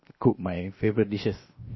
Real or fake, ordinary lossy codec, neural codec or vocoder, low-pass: fake; MP3, 24 kbps; codec, 16 kHz, 0.7 kbps, FocalCodec; 7.2 kHz